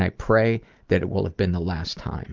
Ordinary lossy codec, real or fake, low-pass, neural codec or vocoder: Opus, 32 kbps; real; 7.2 kHz; none